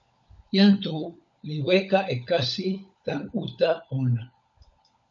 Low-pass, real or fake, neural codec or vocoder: 7.2 kHz; fake; codec, 16 kHz, 16 kbps, FunCodec, trained on LibriTTS, 50 frames a second